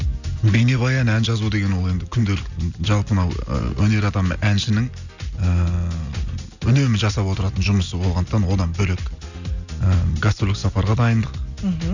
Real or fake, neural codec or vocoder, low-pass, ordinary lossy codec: real; none; 7.2 kHz; none